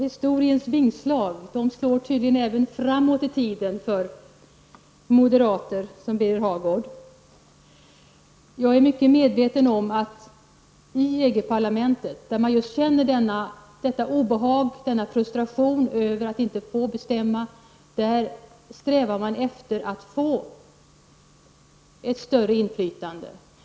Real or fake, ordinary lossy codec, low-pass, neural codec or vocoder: real; none; none; none